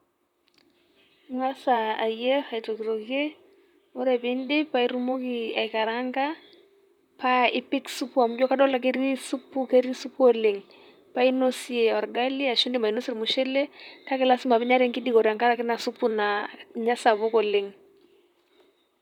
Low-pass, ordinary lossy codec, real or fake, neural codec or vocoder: 19.8 kHz; none; fake; vocoder, 44.1 kHz, 128 mel bands, Pupu-Vocoder